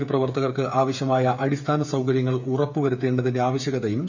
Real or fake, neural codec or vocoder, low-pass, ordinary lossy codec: fake; codec, 16 kHz, 8 kbps, FreqCodec, smaller model; 7.2 kHz; none